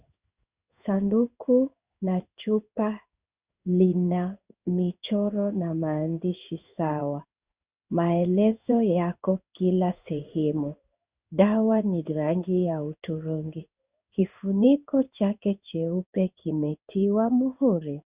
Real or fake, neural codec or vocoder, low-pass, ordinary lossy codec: fake; codec, 16 kHz in and 24 kHz out, 1 kbps, XY-Tokenizer; 3.6 kHz; Opus, 64 kbps